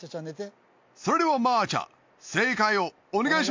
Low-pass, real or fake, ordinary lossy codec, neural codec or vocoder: 7.2 kHz; real; MP3, 48 kbps; none